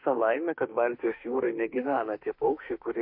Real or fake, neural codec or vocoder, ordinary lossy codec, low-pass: fake; autoencoder, 48 kHz, 32 numbers a frame, DAC-VAE, trained on Japanese speech; MP3, 32 kbps; 5.4 kHz